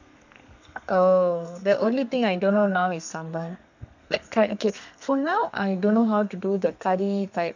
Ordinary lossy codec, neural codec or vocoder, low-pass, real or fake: none; codec, 32 kHz, 1.9 kbps, SNAC; 7.2 kHz; fake